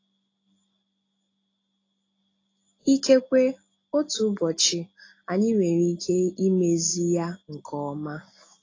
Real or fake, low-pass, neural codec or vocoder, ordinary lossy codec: real; 7.2 kHz; none; AAC, 32 kbps